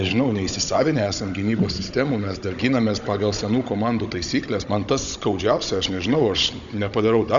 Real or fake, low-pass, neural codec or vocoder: fake; 7.2 kHz; codec, 16 kHz, 16 kbps, FunCodec, trained on Chinese and English, 50 frames a second